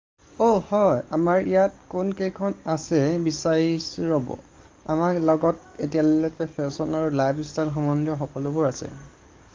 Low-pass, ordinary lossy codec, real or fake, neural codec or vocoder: 7.2 kHz; Opus, 32 kbps; fake; codec, 44.1 kHz, 7.8 kbps, DAC